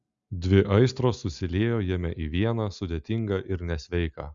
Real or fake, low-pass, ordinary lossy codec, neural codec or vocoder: real; 7.2 kHz; Opus, 64 kbps; none